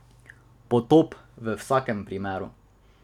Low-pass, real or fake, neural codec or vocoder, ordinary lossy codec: 19.8 kHz; real; none; none